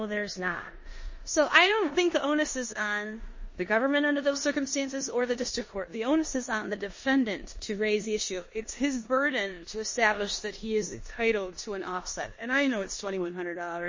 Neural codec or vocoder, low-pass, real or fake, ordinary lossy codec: codec, 16 kHz in and 24 kHz out, 0.9 kbps, LongCat-Audio-Codec, four codebook decoder; 7.2 kHz; fake; MP3, 32 kbps